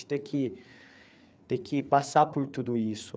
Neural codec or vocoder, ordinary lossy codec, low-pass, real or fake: codec, 16 kHz, 4 kbps, FreqCodec, larger model; none; none; fake